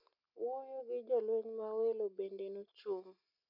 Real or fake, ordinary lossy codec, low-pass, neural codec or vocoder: real; none; 5.4 kHz; none